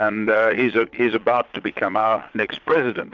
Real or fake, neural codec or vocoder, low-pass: fake; vocoder, 22.05 kHz, 80 mel bands, Vocos; 7.2 kHz